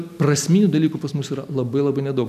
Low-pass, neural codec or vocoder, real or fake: 14.4 kHz; none; real